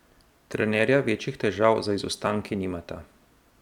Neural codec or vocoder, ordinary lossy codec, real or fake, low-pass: vocoder, 44.1 kHz, 128 mel bands every 256 samples, BigVGAN v2; none; fake; 19.8 kHz